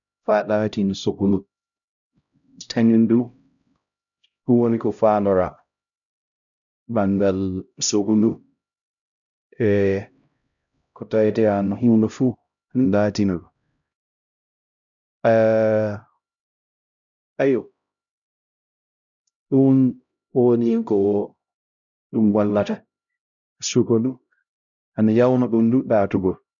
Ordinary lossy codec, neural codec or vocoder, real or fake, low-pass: none; codec, 16 kHz, 0.5 kbps, X-Codec, HuBERT features, trained on LibriSpeech; fake; 7.2 kHz